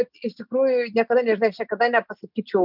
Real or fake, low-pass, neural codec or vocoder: real; 5.4 kHz; none